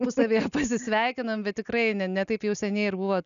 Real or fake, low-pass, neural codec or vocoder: real; 7.2 kHz; none